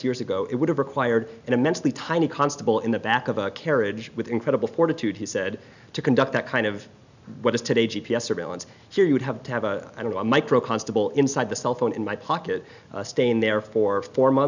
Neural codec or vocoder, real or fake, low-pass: none; real; 7.2 kHz